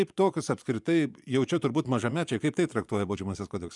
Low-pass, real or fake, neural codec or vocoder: 10.8 kHz; fake; vocoder, 24 kHz, 100 mel bands, Vocos